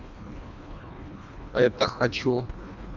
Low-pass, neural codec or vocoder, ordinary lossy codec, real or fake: 7.2 kHz; codec, 24 kHz, 1.5 kbps, HILCodec; none; fake